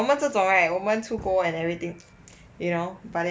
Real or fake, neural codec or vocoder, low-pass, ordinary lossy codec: real; none; none; none